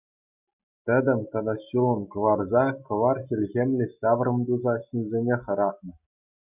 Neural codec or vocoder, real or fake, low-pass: none; real; 3.6 kHz